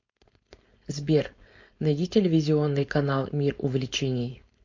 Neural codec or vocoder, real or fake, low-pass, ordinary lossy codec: codec, 16 kHz, 4.8 kbps, FACodec; fake; 7.2 kHz; MP3, 48 kbps